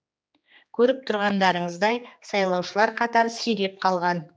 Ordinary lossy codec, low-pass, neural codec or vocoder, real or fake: none; none; codec, 16 kHz, 2 kbps, X-Codec, HuBERT features, trained on general audio; fake